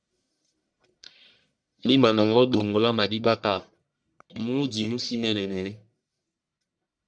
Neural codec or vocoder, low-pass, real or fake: codec, 44.1 kHz, 1.7 kbps, Pupu-Codec; 9.9 kHz; fake